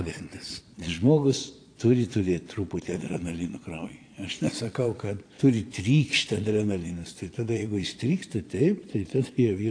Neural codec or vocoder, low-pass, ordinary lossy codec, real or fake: vocoder, 22.05 kHz, 80 mel bands, Vocos; 9.9 kHz; AAC, 48 kbps; fake